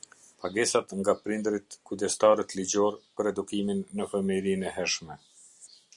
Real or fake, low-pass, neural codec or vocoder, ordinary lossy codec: real; 10.8 kHz; none; Opus, 64 kbps